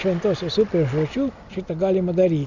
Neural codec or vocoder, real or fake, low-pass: none; real; 7.2 kHz